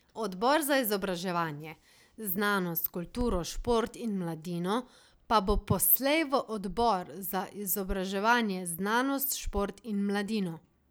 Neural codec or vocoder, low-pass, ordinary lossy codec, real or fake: none; none; none; real